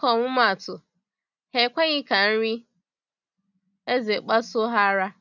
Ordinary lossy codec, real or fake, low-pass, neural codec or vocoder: none; real; 7.2 kHz; none